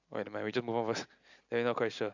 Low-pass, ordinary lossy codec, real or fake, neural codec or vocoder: 7.2 kHz; none; real; none